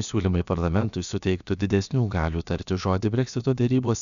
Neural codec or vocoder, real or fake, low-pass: codec, 16 kHz, about 1 kbps, DyCAST, with the encoder's durations; fake; 7.2 kHz